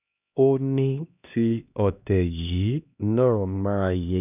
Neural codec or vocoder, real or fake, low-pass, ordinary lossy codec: codec, 16 kHz, 1 kbps, X-Codec, HuBERT features, trained on LibriSpeech; fake; 3.6 kHz; none